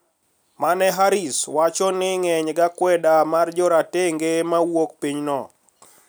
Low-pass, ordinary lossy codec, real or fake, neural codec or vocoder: none; none; real; none